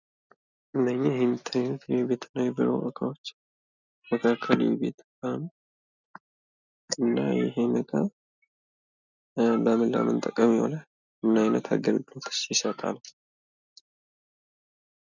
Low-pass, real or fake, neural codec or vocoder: 7.2 kHz; real; none